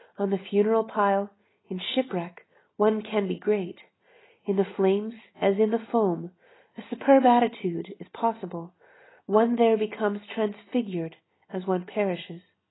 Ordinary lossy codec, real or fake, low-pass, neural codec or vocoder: AAC, 16 kbps; real; 7.2 kHz; none